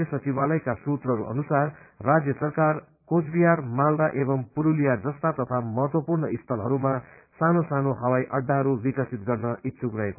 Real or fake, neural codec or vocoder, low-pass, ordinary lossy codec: fake; vocoder, 22.05 kHz, 80 mel bands, Vocos; 3.6 kHz; none